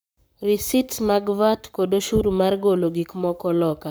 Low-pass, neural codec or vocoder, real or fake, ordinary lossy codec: none; codec, 44.1 kHz, 7.8 kbps, Pupu-Codec; fake; none